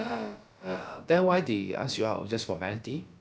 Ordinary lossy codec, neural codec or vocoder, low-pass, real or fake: none; codec, 16 kHz, about 1 kbps, DyCAST, with the encoder's durations; none; fake